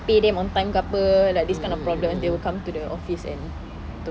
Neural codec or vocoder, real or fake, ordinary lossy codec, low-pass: none; real; none; none